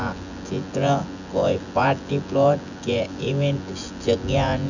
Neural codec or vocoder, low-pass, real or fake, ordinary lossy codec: vocoder, 24 kHz, 100 mel bands, Vocos; 7.2 kHz; fake; none